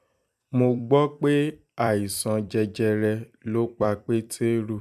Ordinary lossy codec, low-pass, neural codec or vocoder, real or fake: none; 14.4 kHz; vocoder, 44.1 kHz, 128 mel bands every 256 samples, BigVGAN v2; fake